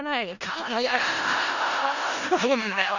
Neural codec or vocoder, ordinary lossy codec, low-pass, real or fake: codec, 16 kHz in and 24 kHz out, 0.4 kbps, LongCat-Audio-Codec, four codebook decoder; none; 7.2 kHz; fake